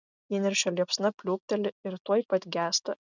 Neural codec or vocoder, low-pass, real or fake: none; 7.2 kHz; real